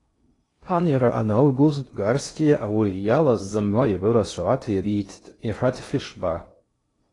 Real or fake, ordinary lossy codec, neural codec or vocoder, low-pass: fake; AAC, 32 kbps; codec, 16 kHz in and 24 kHz out, 0.6 kbps, FocalCodec, streaming, 2048 codes; 10.8 kHz